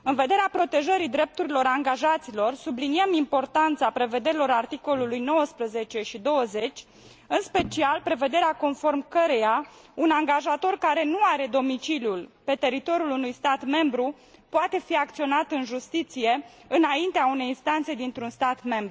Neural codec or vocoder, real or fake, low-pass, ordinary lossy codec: none; real; none; none